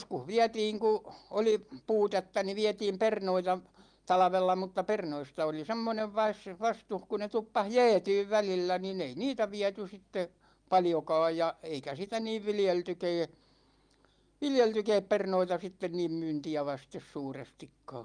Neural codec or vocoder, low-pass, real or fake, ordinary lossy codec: none; 9.9 kHz; real; Opus, 32 kbps